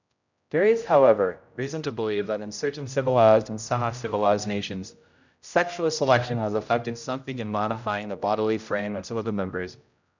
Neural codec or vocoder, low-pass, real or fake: codec, 16 kHz, 0.5 kbps, X-Codec, HuBERT features, trained on general audio; 7.2 kHz; fake